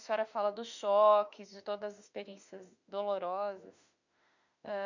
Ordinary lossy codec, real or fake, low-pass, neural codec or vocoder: none; fake; 7.2 kHz; autoencoder, 48 kHz, 32 numbers a frame, DAC-VAE, trained on Japanese speech